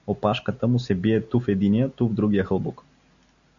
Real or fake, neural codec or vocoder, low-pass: real; none; 7.2 kHz